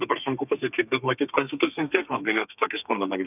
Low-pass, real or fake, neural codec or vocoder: 3.6 kHz; fake; codec, 32 kHz, 1.9 kbps, SNAC